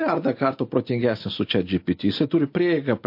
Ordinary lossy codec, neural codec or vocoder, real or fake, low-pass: MP3, 32 kbps; none; real; 5.4 kHz